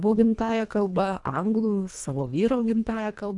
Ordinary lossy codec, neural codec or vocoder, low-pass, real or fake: MP3, 96 kbps; codec, 24 kHz, 1.5 kbps, HILCodec; 10.8 kHz; fake